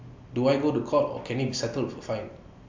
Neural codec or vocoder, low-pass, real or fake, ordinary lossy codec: none; 7.2 kHz; real; MP3, 64 kbps